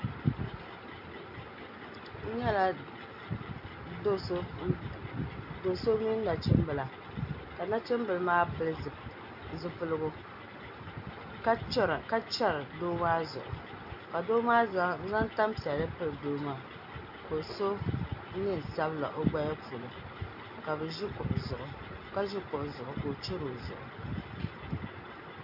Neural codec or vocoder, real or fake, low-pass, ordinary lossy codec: none; real; 5.4 kHz; AAC, 48 kbps